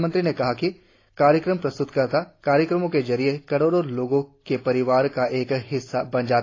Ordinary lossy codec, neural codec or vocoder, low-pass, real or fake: AAC, 32 kbps; none; 7.2 kHz; real